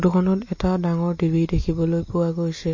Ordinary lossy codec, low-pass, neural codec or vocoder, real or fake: MP3, 32 kbps; 7.2 kHz; none; real